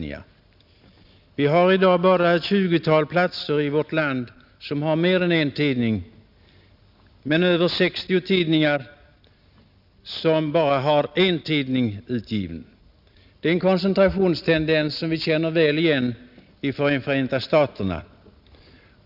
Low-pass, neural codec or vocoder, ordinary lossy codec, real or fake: 5.4 kHz; none; none; real